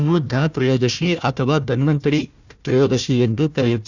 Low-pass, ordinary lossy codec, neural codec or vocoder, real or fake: 7.2 kHz; none; codec, 16 kHz, 1 kbps, FunCodec, trained on Chinese and English, 50 frames a second; fake